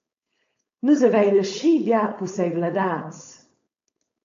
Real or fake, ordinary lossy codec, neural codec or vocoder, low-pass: fake; AAC, 48 kbps; codec, 16 kHz, 4.8 kbps, FACodec; 7.2 kHz